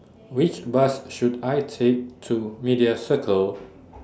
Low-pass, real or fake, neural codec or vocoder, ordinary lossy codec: none; real; none; none